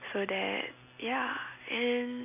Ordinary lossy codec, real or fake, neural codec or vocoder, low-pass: none; real; none; 3.6 kHz